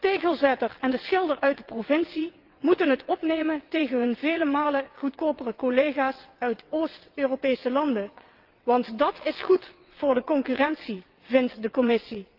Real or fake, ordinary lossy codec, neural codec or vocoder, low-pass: fake; Opus, 32 kbps; vocoder, 22.05 kHz, 80 mel bands, WaveNeXt; 5.4 kHz